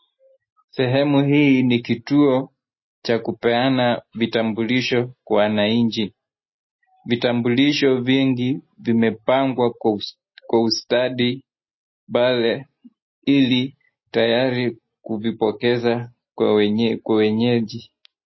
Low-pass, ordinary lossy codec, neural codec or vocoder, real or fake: 7.2 kHz; MP3, 24 kbps; none; real